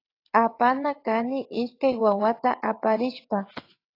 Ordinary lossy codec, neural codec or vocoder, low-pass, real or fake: AAC, 32 kbps; vocoder, 22.05 kHz, 80 mel bands, WaveNeXt; 5.4 kHz; fake